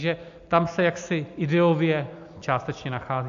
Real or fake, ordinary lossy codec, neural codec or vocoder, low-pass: real; AAC, 64 kbps; none; 7.2 kHz